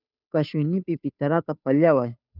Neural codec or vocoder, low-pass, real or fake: codec, 16 kHz, 2 kbps, FunCodec, trained on Chinese and English, 25 frames a second; 5.4 kHz; fake